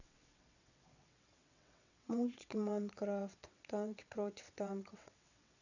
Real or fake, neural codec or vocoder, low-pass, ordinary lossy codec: fake; vocoder, 22.05 kHz, 80 mel bands, WaveNeXt; 7.2 kHz; none